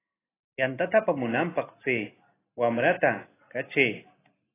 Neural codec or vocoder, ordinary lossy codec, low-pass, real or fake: none; AAC, 16 kbps; 3.6 kHz; real